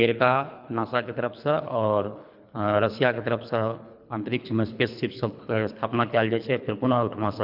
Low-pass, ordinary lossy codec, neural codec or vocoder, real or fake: 5.4 kHz; none; codec, 24 kHz, 3 kbps, HILCodec; fake